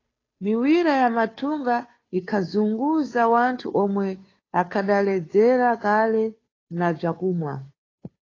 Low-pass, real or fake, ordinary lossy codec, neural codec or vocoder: 7.2 kHz; fake; AAC, 32 kbps; codec, 16 kHz, 2 kbps, FunCodec, trained on Chinese and English, 25 frames a second